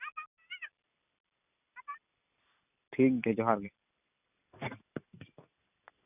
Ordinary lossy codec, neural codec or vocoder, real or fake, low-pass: none; none; real; 3.6 kHz